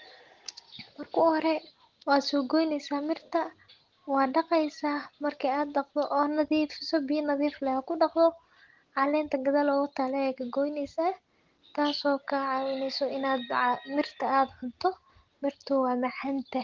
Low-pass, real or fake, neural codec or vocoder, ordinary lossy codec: 7.2 kHz; real; none; Opus, 16 kbps